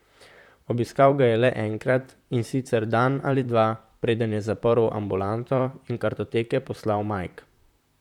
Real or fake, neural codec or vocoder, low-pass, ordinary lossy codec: fake; vocoder, 44.1 kHz, 128 mel bands, Pupu-Vocoder; 19.8 kHz; none